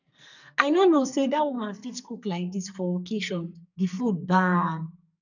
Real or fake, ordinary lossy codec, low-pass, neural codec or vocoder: fake; none; 7.2 kHz; codec, 44.1 kHz, 2.6 kbps, SNAC